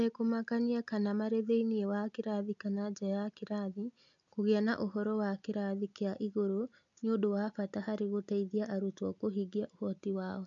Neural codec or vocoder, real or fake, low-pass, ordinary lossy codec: none; real; 7.2 kHz; MP3, 64 kbps